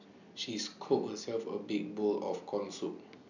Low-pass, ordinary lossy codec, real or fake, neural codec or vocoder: 7.2 kHz; none; real; none